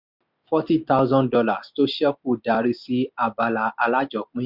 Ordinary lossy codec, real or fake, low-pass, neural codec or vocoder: none; real; 5.4 kHz; none